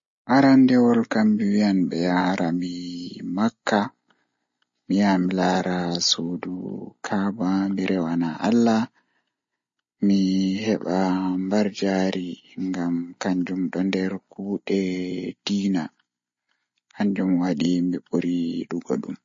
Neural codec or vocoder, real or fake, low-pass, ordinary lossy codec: none; real; 7.2 kHz; MP3, 32 kbps